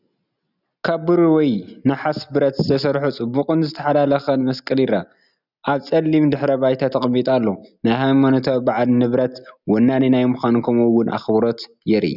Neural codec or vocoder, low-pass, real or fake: none; 5.4 kHz; real